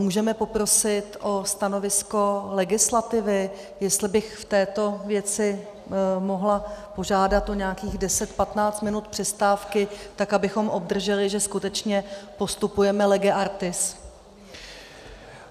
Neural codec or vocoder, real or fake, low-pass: none; real; 14.4 kHz